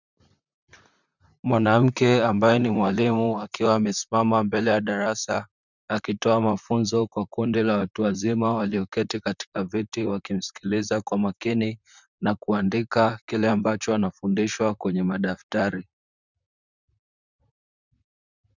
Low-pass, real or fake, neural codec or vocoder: 7.2 kHz; fake; vocoder, 44.1 kHz, 128 mel bands, Pupu-Vocoder